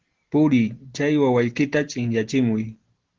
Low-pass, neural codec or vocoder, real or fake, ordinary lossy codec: 7.2 kHz; none; real; Opus, 16 kbps